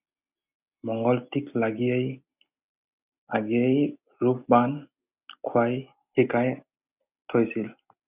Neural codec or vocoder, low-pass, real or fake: none; 3.6 kHz; real